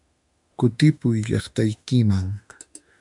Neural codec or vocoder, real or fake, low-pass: autoencoder, 48 kHz, 32 numbers a frame, DAC-VAE, trained on Japanese speech; fake; 10.8 kHz